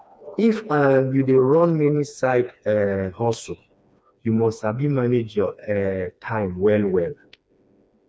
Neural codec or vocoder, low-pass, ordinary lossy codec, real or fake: codec, 16 kHz, 2 kbps, FreqCodec, smaller model; none; none; fake